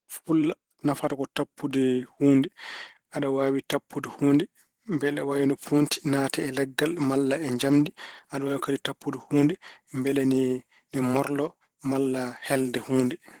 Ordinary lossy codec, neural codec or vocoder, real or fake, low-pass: Opus, 16 kbps; vocoder, 44.1 kHz, 128 mel bands every 512 samples, BigVGAN v2; fake; 19.8 kHz